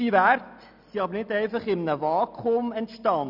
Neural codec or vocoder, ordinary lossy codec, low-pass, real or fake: none; none; 5.4 kHz; real